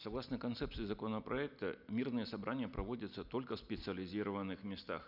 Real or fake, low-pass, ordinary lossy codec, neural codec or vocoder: real; 5.4 kHz; none; none